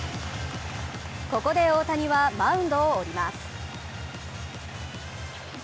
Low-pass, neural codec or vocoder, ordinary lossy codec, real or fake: none; none; none; real